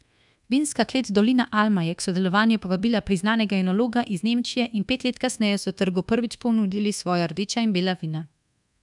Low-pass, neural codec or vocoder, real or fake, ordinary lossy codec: 10.8 kHz; codec, 24 kHz, 1.2 kbps, DualCodec; fake; none